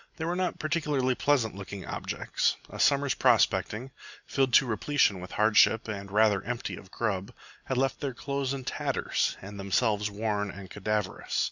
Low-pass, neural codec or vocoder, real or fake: 7.2 kHz; none; real